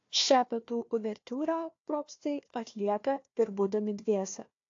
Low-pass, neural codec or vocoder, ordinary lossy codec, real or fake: 7.2 kHz; codec, 16 kHz, 1 kbps, FunCodec, trained on Chinese and English, 50 frames a second; AAC, 48 kbps; fake